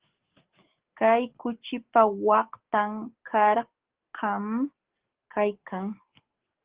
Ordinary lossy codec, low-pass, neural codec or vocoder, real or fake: Opus, 16 kbps; 3.6 kHz; codec, 44.1 kHz, 7.8 kbps, DAC; fake